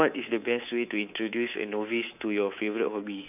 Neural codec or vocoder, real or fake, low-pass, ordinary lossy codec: codec, 24 kHz, 3.1 kbps, DualCodec; fake; 3.6 kHz; none